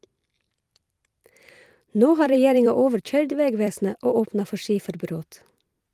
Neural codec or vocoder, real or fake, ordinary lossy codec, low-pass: vocoder, 44.1 kHz, 128 mel bands every 256 samples, BigVGAN v2; fake; Opus, 32 kbps; 14.4 kHz